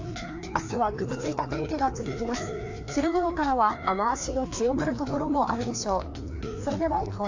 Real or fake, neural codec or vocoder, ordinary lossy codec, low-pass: fake; codec, 16 kHz, 2 kbps, FreqCodec, larger model; AAC, 48 kbps; 7.2 kHz